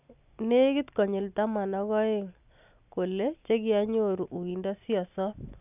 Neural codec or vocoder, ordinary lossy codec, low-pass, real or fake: none; none; 3.6 kHz; real